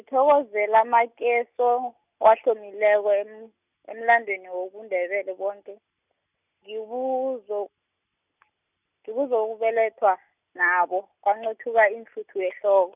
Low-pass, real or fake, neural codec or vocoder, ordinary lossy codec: 3.6 kHz; real; none; none